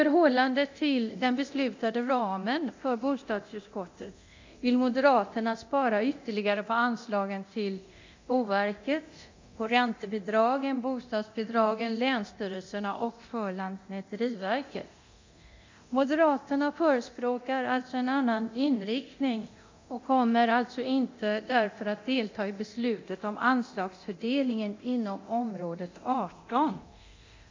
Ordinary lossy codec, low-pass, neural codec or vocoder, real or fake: MP3, 64 kbps; 7.2 kHz; codec, 24 kHz, 0.9 kbps, DualCodec; fake